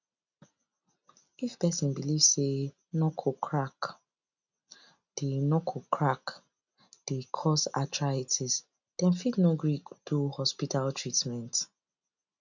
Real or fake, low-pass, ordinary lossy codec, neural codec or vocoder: real; 7.2 kHz; none; none